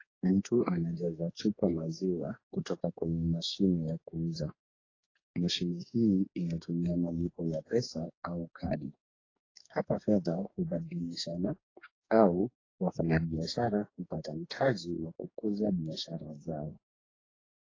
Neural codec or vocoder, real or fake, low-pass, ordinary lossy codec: codec, 44.1 kHz, 2.6 kbps, DAC; fake; 7.2 kHz; AAC, 32 kbps